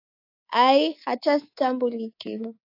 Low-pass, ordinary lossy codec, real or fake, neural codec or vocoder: 5.4 kHz; AAC, 32 kbps; fake; codec, 24 kHz, 3.1 kbps, DualCodec